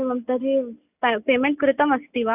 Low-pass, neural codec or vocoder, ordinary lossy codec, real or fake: 3.6 kHz; none; none; real